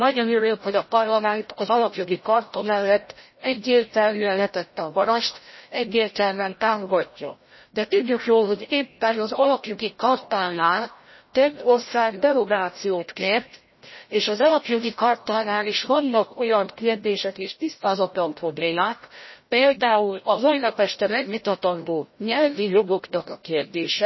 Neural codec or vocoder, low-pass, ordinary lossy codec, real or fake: codec, 16 kHz, 0.5 kbps, FreqCodec, larger model; 7.2 kHz; MP3, 24 kbps; fake